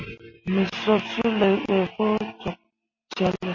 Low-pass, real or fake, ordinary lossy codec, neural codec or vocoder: 7.2 kHz; real; MP3, 32 kbps; none